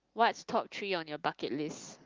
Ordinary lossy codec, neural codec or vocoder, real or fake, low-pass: Opus, 32 kbps; none; real; 7.2 kHz